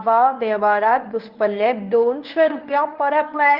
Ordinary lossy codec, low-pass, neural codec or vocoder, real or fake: Opus, 32 kbps; 5.4 kHz; codec, 24 kHz, 0.9 kbps, WavTokenizer, medium speech release version 1; fake